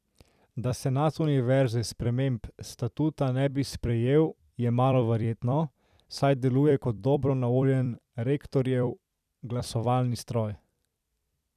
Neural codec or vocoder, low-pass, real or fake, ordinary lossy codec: vocoder, 44.1 kHz, 128 mel bands every 256 samples, BigVGAN v2; 14.4 kHz; fake; none